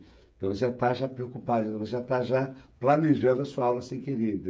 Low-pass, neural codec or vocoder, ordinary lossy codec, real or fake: none; codec, 16 kHz, 8 kbps, FreqCodec, smaller model; none; fake